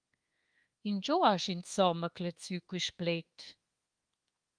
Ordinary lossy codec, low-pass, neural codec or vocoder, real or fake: Opus, 24 kbps; 9.9 kHz; codec, 24 kHz, 1.2 kbps, DualCodec; fake